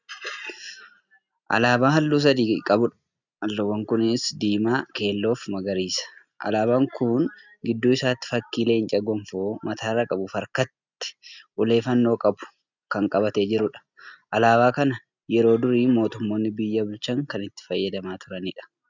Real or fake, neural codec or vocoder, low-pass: real; none; 7.2 kHz